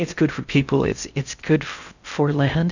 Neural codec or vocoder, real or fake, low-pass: codec, 16 kHz in and 24 kHz out, 0.6 kbps, FocalCodec, streaming, 4096 codes; fake; 7.2 kHz